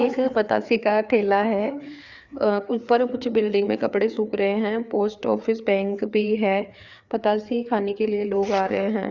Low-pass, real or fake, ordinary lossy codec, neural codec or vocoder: 7.2 kHz; fake; Opus, 64 kbps; codec, 16 kHz, 4 kbps, FreqCodec, larger model